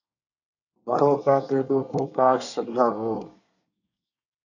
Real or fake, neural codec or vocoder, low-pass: fake; codec, 24 kHz, 1 kbps, SNAC; 7.2 kHz